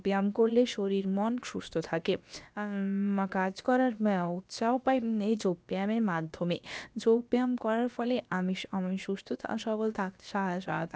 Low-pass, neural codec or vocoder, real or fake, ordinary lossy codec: none; codec, 16 kHz, about 1 kbps, DyCAST, with the encoder's durations; fake; none